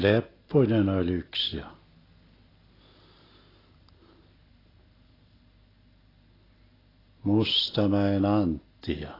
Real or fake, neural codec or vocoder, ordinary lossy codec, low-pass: real; none; AAC, 24 kbps; 5.4 kHz